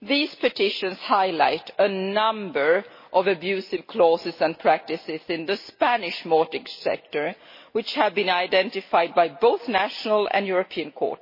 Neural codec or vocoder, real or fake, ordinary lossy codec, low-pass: none; real; MP3, 24 kbps; 5.4 kHz